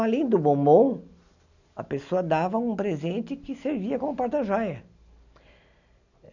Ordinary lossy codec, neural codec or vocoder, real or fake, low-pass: none; none; real; 7.2 kHz